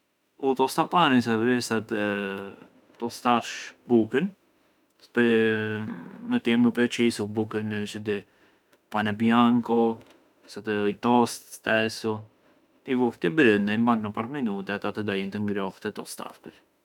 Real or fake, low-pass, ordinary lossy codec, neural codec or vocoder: fake; 19.8 kHz; none; autoencoder, 48 kHz, 32 numbers a frame, DAC-VAE, trained on Japanese speech